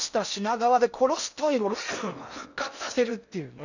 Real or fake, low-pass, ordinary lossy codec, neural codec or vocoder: fake; 7.2 kHz; none; codec, 16 kHz in and 24 kHz out, 0.6 kbps, FocalCodec, streaming, 4096 codes